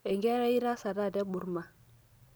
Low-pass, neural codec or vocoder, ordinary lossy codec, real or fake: none; none; none; real